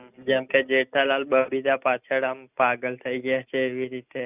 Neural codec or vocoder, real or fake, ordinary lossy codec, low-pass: none; real; none; 3.6 kHz